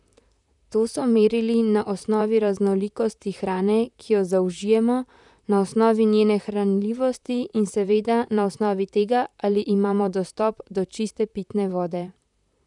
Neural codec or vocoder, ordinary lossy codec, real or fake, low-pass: vocoder, 44.1 kHz, 128 mel bands, Pupu-Vocoder; none; fake; 10.8 kHz